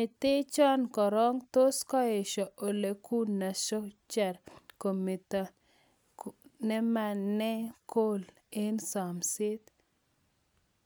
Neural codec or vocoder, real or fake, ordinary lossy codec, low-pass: none; real; none; none